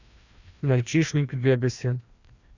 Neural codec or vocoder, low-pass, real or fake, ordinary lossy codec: codec, 16 kHz, 1 kbps, FreqCodec, larger model; 7.2 kHz; fake; Opus, 64 kbps